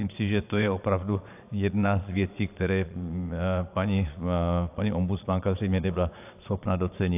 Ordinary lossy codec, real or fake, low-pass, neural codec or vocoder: AAC, 32 kbps; fake; 3.6 kHz; vocoder, 22.05 kHz, 80 mel bands, Vocos